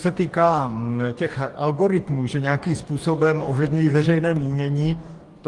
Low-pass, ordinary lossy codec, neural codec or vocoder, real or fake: 10.8 kHz; Opus, 24 kbps; codec, 44.1 kHz, 2.6 kbps, DAC; fake